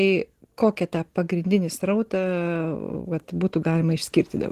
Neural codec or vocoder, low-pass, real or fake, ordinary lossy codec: none; 14.4 kHz; real; Opus, 16 kbps